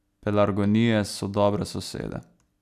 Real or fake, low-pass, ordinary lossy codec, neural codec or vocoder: real; 14.4 kHz; none; none